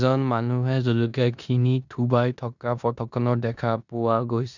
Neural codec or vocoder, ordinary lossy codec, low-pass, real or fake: codec, 16 kHz in and 24 kHz out, 0.9 kbps, LongCat-Audio-Codec, four codebook decoder; none; 7.2 kHz; fake